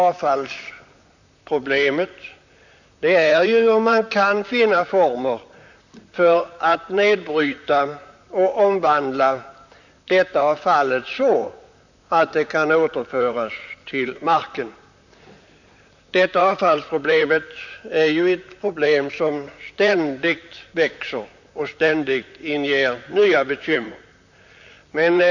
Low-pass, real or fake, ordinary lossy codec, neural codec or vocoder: 7.2 kHz; fake; none; vocoder, 44.1 kHz, 128 mel bands, Pupu-Vocoder